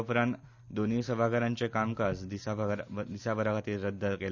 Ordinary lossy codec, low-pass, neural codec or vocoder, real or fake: none; 7.2 kHz; none; real